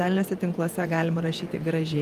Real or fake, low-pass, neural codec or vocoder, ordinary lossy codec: fake; 14.4 kHz; vocoder, 48 kHz, 128 mel bands, Vocos; Opus, 32 kbps